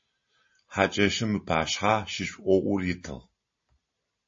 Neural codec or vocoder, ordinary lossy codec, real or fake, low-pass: none; MP3, 32 kbps; real; 7.2 kHz